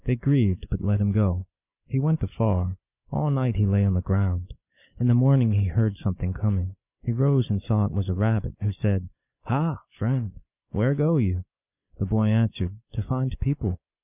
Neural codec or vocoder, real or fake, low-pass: none; real; 3.6 kHz